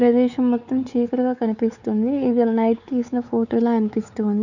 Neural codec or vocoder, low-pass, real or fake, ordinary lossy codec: codec, 16 kHz, 4 kbps, X-Codec, WavLM features, trained on Multilingual LibriSpeech; 7.2 kHz; fake; none